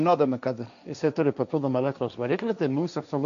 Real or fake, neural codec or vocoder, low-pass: fake; codec, 16 kHz, 1.1 kbps, Voila-Tokenizer; 7.2 kHz